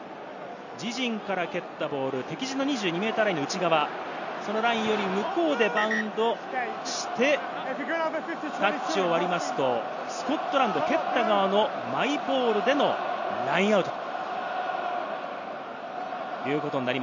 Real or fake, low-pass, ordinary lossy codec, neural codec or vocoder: real; 7.2 kHz; none; none